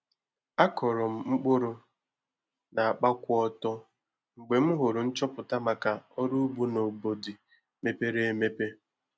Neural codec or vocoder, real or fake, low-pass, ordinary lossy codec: none; real; none; none